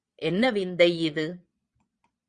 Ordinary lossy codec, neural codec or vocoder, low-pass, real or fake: Opus, 64 kbps; vocoder, 22.05 kHz, 80 mel bands, Vocos; 9.9 kHz; fake